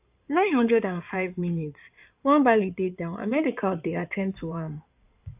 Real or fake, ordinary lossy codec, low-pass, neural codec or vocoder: fake; AAC, 32 kbps; 3.6 kHz; codec, 16 kHz in and 24 kHz out, 2.2 kbps, FireRedTTS-2 codec